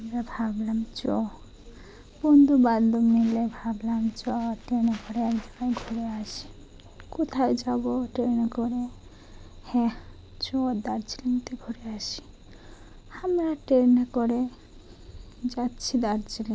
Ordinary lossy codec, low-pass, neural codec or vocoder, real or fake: none; none; none; real